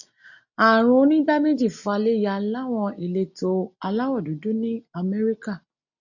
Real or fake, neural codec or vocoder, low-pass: real; none; 7.2 kHz